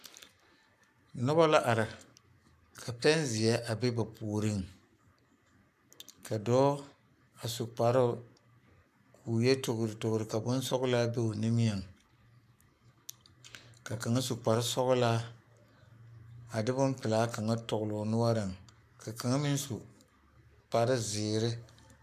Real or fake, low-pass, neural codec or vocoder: fake; 14.4 kHz; codec, 44.1 kHz, 7.8 kbps, Pupu-Codec